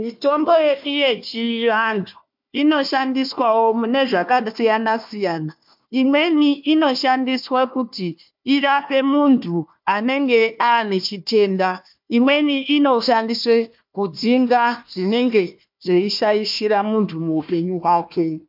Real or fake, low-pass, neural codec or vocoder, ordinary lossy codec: fake; 5.4 kHz; codec, 16 kHz, 1 kbps, FunCodec, trained on Chinese and English, 50 frames a second; MP3, 48 kbps